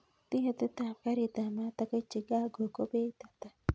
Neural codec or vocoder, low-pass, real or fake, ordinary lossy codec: none; none; real; none